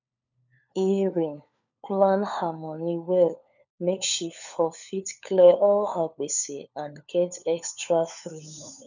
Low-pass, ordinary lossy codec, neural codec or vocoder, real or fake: 7.2 kHz; none; codec, 16 kHz, 4 kbps, FunCodec, trained on LibriTTS, 50 frames a second; fake